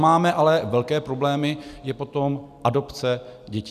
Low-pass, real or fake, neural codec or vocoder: 14.4 kHz; real; none